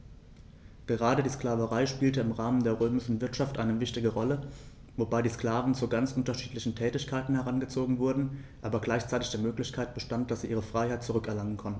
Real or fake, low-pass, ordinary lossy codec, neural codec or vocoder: real; none; none; none